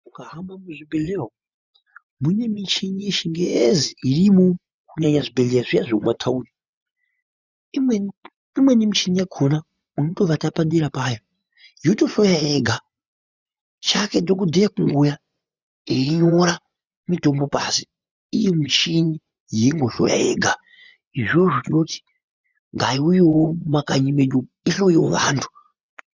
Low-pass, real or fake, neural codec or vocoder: 7.2 kHz; fake; vocoder, 24 kHz, 100 mel bands, Vocos